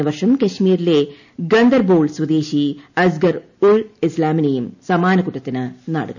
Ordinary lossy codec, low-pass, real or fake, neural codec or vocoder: none; 7.2 kHz; real; none